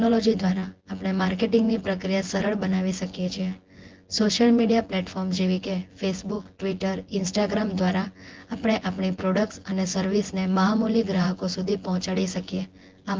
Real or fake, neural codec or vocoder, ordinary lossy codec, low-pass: fake; vocoder, 24 kHz, 100 mel bands, Vocos; Opus, 16 kbps; 7.2 kHz